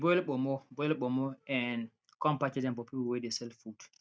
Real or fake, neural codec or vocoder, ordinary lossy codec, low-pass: real; none; none; none